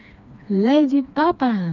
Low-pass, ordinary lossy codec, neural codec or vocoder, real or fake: 7.2 kHz; none; codec, 16 kHz, 2 kbps, FreqCodec, smaller model; fake